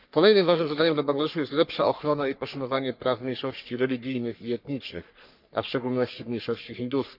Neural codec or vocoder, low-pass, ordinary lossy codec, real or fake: codec, 44.1 kHz, 3.4 kbps, Pupu-Codec; 5.4 kHz; none; fake